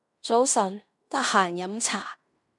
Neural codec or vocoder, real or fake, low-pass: codec, 16 kHz in and 24 kHz out, 0.9 kbps, LongCat-Audio-Codec, fine tuned four codebook decoder; fake; 10.8 kHz